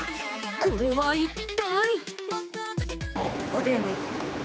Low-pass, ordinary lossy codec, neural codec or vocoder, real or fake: none; none; codec, 16 kHz, 4 kbps, X-Codec, HuBERT features, trained on general audio; fake